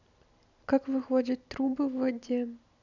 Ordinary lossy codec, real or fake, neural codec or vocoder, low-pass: none; real; none; 7.2 kHz